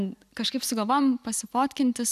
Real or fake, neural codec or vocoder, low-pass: real; none; 14.4 kHz